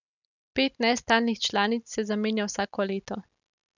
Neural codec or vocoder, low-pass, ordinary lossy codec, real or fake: none; 7.2 kHz; none; real